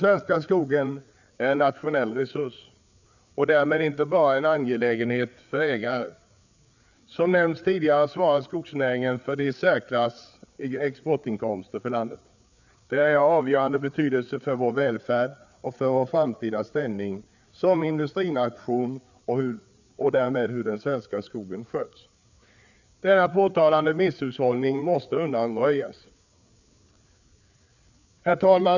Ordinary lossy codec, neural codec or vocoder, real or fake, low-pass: none; codec, 16 kHz, 4 kbps, FreqCodec, larger model; fake; 7.2 kHz